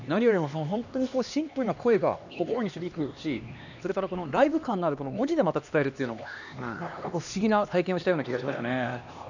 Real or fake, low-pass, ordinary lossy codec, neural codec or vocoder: fake; 7.2 kHz; none; codec, 16 kHz, 2 kbps, X-Codec, HuBERT features, trained on LibriSpeech